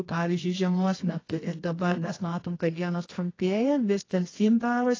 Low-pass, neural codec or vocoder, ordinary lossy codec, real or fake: 7.2 kHz; codec, 24 kHz, 0.9 kbps, WavTokenizer, medium music audio release; AAC, 32 kbps; fake